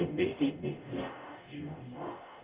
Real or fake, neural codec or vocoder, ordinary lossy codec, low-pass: fake; codec, 44.1 kHz, 0.9 kbps, DAC; Opus, 24 kbps; 3.6 kHz